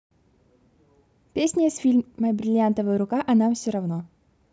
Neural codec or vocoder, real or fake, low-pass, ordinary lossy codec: none; real; none; none